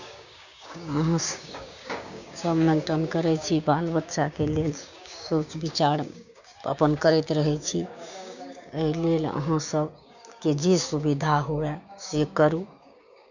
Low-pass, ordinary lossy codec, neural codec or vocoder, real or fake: 7.2 kHz; none; codec, 16 kHz, 6 kbps, DAC; fake